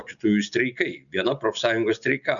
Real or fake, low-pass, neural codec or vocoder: real; 7.2 kHz; none